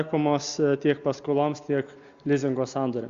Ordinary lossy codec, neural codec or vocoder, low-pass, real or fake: Opus, 64 kbps; none; 7.2 kHz; real